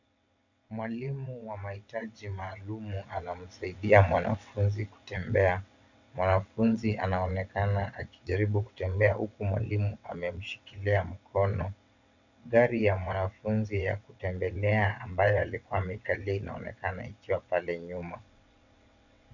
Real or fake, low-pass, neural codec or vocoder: fake; 7.2 kHz; vocoder, 22.05 kHz, 80 mel bands, WaveNeXt